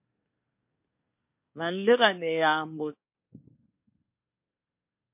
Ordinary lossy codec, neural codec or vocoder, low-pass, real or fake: MP3, 32 kbps; codec, 24 kHz, 1 kbps, SNAC; 3.6 kHz; fake